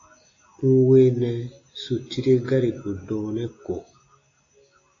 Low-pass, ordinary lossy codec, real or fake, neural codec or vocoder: 7.2 kHz; AAC, 32 kbps; real; none